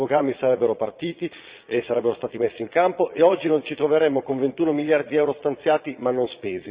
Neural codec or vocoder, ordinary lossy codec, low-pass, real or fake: vocoder, 22.05 kHz, 80 mel bands, Vocos; Opus, 64 kbps; 3.6 kHz; fake